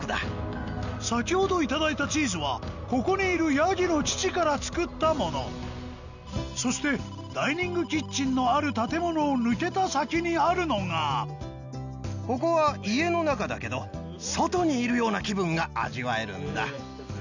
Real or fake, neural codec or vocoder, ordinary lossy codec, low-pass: real; none; none; 7.2 kHz